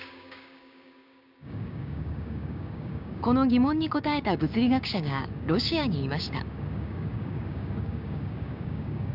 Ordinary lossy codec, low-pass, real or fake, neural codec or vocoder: none; 5.4 kHz; fake; codec, 16 kHz, 6 kbps, DAC